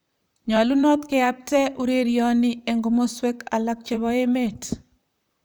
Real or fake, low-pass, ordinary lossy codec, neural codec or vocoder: fake; none; none; vocoder, 44.1 kHz, 128 mel bands, Pupu-Vocoder